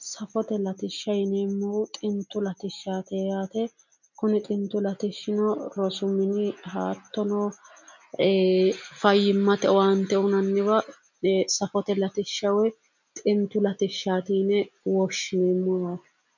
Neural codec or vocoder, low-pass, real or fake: none; 7.2 kHz; real